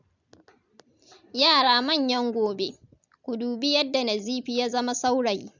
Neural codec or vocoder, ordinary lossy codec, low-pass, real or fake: vocoder, 44.1 kHz, 128 mel bands every 256 samples, BigVGAN v2; none; 7.2 kHz; fake